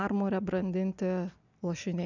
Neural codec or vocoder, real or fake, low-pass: none; real; 7.2 kHz